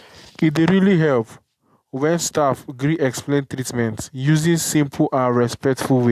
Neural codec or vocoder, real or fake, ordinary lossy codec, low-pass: none; real; AAC, 96 kbps; 14.4 kHz